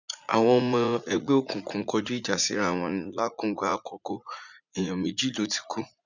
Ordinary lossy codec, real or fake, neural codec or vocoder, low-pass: none; fake; vocoder, 44.1 kHz, 80 mel bands, Vocos; 7.2 kHz